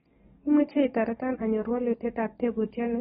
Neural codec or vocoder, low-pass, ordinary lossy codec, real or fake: codec, 44.1 kHz, 7.8 kbps, DAC; 19.8 kHz; AAC, 16 kbps; fake